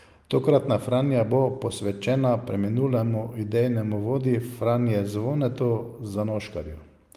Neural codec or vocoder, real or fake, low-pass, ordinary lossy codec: none; real; 14.4 kHz; Opus, 32 kbps